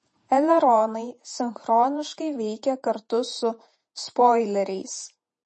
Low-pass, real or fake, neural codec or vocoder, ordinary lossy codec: 10.8 kHz; fake; vocoder, 48 kHz, 128 mel bands, Vocos; MP3, 32 kbps